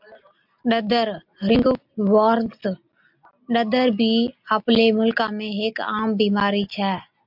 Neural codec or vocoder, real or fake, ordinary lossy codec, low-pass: none; real; MP3, 48 kbps; 5.4 kHz